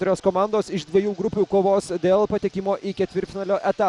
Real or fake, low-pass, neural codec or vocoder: real; 10.8 kHz; none